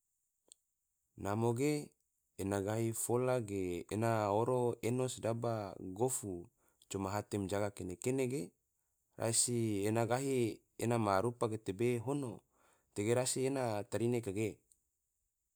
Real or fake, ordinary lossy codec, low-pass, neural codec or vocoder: real; none; none; none